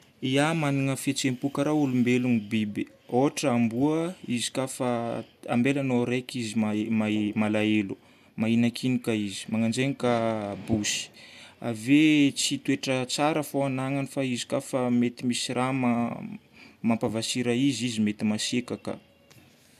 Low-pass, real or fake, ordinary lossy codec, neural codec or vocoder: 14.4 kHz; real; none; none